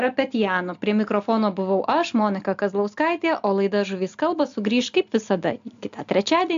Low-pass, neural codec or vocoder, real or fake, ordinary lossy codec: 7.2 kHz; none; real; AAC, 64 kbps